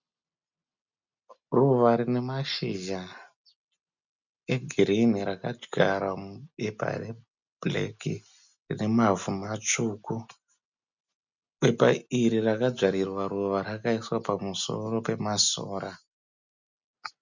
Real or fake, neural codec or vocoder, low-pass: real; none; 7.2 kHz